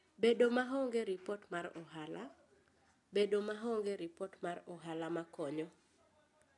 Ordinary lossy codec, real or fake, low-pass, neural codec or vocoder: none; real; 10.8 kHz; none